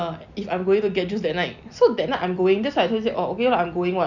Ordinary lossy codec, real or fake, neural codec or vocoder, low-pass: none; real; none; 7.2 kHz